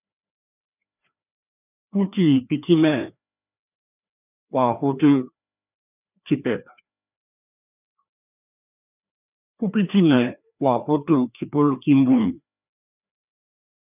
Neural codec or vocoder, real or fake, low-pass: codec, 16 kHz, 2 kbps, FreqCodec, larger model; fake; 3.6 kHz